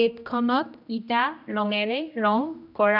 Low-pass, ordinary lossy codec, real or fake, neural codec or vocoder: 5.4 kHz; none; fake; codec, 16 kHz, 1 kbps, X-Codec, HuBERT features, trained on general audio